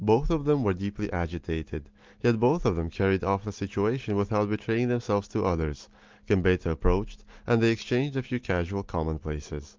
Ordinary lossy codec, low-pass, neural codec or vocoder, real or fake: Opus, 32 kbps; 7.2 kHz; none; real